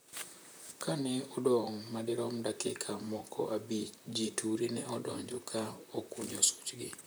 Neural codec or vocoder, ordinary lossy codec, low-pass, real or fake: vocoder, 44.1 kHz, 128 mel bands, Pupu-Vocoder; none; none; fake